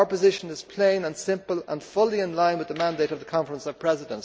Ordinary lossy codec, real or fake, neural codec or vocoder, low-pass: none; real; none; none